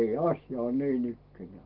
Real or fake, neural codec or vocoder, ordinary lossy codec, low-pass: real; none; Opus, 16 kbps; 5.4 kHz